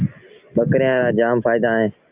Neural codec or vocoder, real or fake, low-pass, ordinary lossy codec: none; real; 3.6 kHz; Opus, 24 kbps